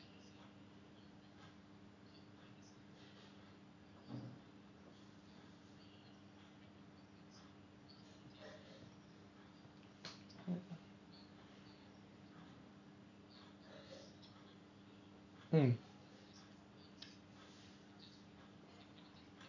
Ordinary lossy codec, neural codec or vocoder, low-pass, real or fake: none; none; 7.2 kHz; real